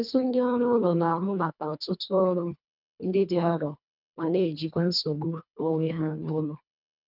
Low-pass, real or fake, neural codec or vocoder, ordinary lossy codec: 5.4 kHz; fake; codec, 24 kHz, 1.5 kbps, HILCodec; none